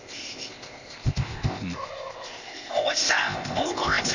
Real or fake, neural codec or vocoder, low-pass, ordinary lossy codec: fake; codec, 16 kHz, 0.8 kbps, ZipCodec; 7.2 kHz; none